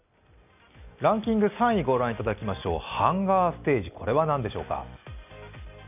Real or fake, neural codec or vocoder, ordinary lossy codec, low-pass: real; none; none; 3.6 kHz